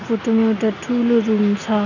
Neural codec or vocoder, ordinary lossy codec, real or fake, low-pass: none; none; real; 7.2 kHz